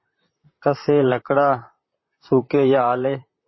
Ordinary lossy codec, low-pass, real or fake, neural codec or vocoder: MP3, 24 kbps; 7.2 kHz; fake; vocoder, 44.1 kHz, 128 mel bands, Pupu-Vocoder